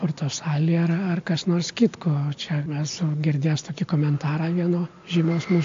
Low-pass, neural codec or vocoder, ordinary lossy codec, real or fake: 7.2 kHz; none; AAC, 48 kbps; real